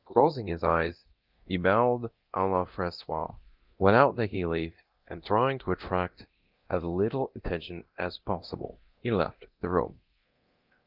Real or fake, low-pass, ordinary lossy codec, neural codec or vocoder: fake; 5.4 kHz; Opus, 32 kbps; codec, 24 kHz, 0.9 kbps, DualCodec